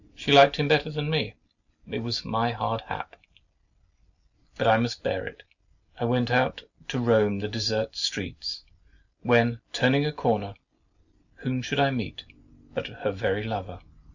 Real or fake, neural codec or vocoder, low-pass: real; none; 7.2 kHz